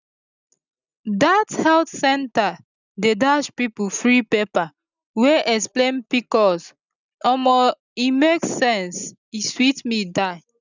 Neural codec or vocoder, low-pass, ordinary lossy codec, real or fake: none; 7.2 kHz; none; real